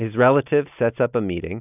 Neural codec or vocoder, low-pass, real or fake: none; 3.6 kHz; real